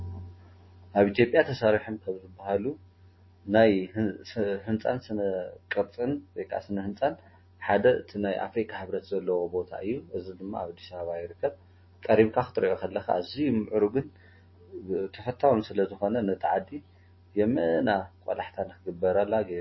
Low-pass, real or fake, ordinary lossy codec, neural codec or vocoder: 7.2 kHz; real; MP3, 24 kbps; none